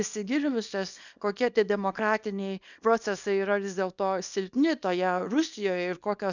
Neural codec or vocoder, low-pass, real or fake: codec, 24 kHz, 0.9 kbps, WavTokenizer, small release; 7.2 kHz; fake